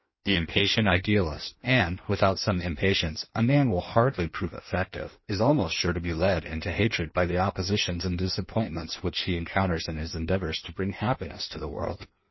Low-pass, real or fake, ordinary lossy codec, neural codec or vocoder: 7.2 kHz; fake; MP3, 24 kbps; codec, 16 kHz in and 24 kHz out, 1.1 kbps, FireRedTTS-2 codec